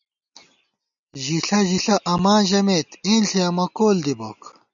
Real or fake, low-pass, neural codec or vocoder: real; 7.2 kHz; none